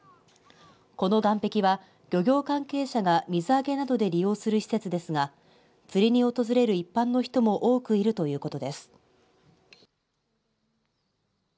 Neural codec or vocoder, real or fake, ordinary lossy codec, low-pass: none; real; none; none